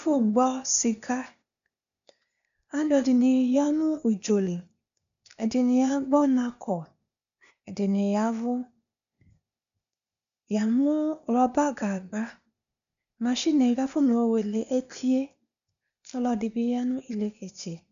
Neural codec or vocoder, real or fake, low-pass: codec, 16 kHz, 0.8 kbps, ZipCodec; fake; 7.2 kHz